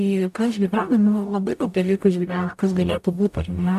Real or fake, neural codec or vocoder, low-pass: fake; codec, 44.1 kHz, 0.9 kbps, DAC; 14.4 kHz